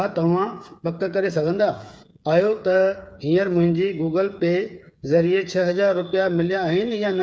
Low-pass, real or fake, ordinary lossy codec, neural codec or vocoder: none; fake; none; codec, 16 kHz, 8 kbps, FreqCodec, smaller model